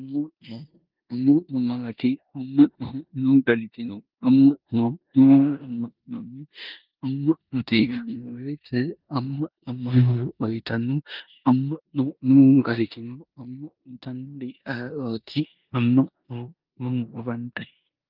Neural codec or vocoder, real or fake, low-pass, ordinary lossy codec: codec, 16 kHz in and 24 kHz out, 0.9 kbps, LongCat-Audio-Codec, four codebook decoder; fake; 5.4 kHz; Opus, 24 kbps